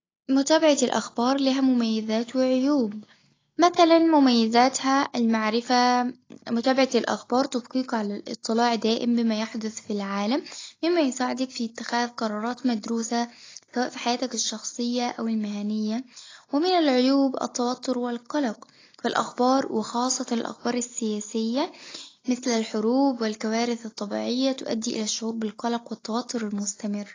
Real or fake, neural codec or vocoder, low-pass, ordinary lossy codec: real; none; 7.2 kHz; AAC, 32 kbps